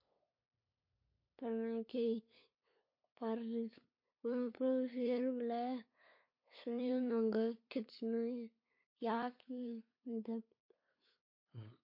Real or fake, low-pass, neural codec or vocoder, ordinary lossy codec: fake; 5.4 kHz; codec, 16 kHz, 8 kbps, FunCodec, trained on LibriTTS, 25 frames a second; MP3, 32 kbps